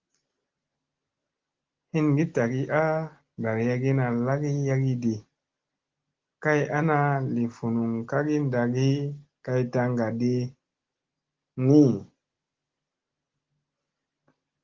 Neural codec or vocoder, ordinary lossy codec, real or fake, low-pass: none; Opus, 16 kbps; real; 7.2 kHz